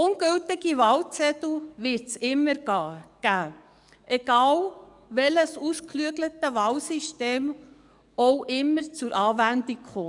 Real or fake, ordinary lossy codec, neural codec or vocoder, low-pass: fake; none; codec, 44.1 kHz, 7.8 kbps, DAC; 10.8 kHz